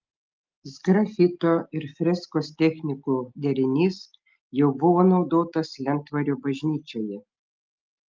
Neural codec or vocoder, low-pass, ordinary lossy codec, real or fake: none; 7.2 kHz; Opus, 24 kbps; real